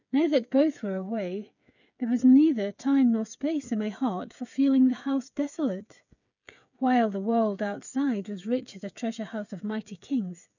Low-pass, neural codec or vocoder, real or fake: 7.2 kHz; codec, 16 kHz, 8 kbps, FreqCodec, smaller model; fake